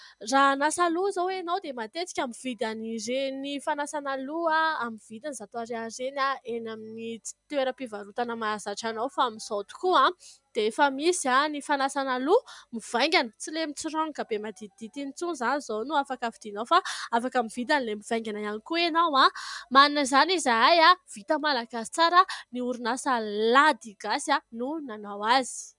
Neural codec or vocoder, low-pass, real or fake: none; 10.8 kHz; real